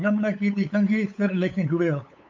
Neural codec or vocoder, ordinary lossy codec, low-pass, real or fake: codec, 16 kHz, 8 kbps, FunCodec, trained on LibriTTS, 25 frames a second; MP3, 64 kbps; 7.2 kHz; fake